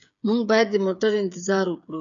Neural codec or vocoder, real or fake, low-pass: codec, 16 kHz, 8 kbps, FreqCodec, smaller model; fake; 7.2 kHz